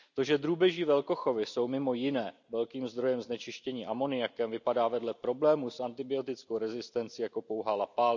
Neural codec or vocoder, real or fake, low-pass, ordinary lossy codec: none; real; 7.2 kHz; none